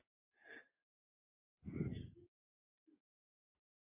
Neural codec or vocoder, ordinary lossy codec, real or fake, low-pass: none; MP3, 32 kbps; real; 3.6 kHz